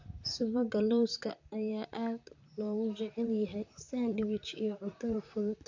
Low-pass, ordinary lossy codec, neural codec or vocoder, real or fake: 7.2 kHz; AAC, 48 kbps; vocoder, 44.1 kHz, 128 mel bands, Pupu-Vocoder; fake